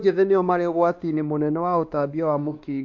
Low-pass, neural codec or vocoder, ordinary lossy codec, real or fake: 7.2 kHz; codec, 16 kHz, 2 kbps, X-Codec, WavLM features, trained on Multilingual LibriSpeech; AAC, 48 kbps; fake